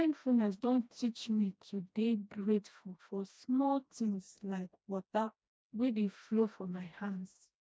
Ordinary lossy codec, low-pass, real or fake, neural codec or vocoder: none; none; fake; codec, 16 kHz, 1 kbps, FreqCodec, smaller model